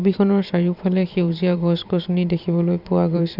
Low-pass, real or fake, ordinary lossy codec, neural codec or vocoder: 5.4 kHz; fake; none; vocoder, 44.1 kHz, 128 mel bands every 512 samples, BigVGAN v2